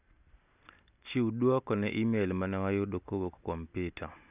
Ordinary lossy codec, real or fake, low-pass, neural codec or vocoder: none; real; 3.6 kHz; none